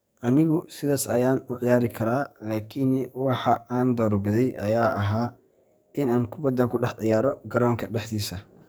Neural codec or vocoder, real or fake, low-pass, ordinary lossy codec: codec, 44.1 kHz, 2.6 kbps, SNAC; fake; none; none